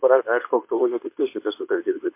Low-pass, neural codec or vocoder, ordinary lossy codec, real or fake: 3.6 kHz; codec, 24 kHz, 0.9 kbps, WavTokenizer, medium speech release version 2; MP3, 24 kbps; fake